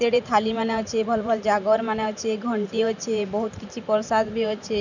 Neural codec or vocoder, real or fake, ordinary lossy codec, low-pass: vocoder, 44.1 kHz, 128 mel bands every 512 samples, BigVGAN v2; fake; none; 7.2 kHz